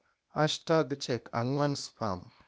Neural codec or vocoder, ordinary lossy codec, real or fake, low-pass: codec, 16 kHz, 0.8 kbps, ZipCodec; none; fake; none